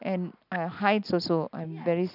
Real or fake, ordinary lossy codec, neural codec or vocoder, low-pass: real; none; none; 5.4 kHz